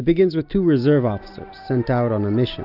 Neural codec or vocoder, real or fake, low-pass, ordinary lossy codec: none; real; 5.4 kHz; AAC, 48 kbps